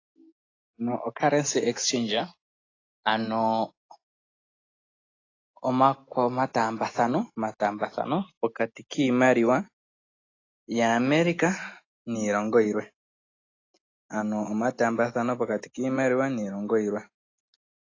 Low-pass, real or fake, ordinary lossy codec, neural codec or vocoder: 7.2 kHz; real; AAC, 32 kbps; none